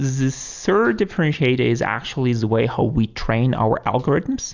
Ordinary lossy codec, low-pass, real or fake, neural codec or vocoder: Opus, 64 kbps; 7.2 kHz; real; none